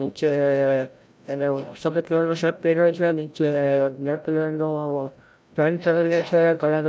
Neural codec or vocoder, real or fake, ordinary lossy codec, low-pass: codec, 16 kHz, 0.5 kbps, FreqCodec, larger model; fake; none; none